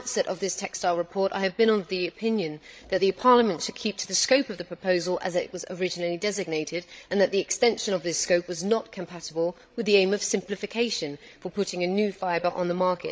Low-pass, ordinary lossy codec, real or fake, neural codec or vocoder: none; none; fake; codec, 16 kHz, 16 kbps, FreqCodec, larger model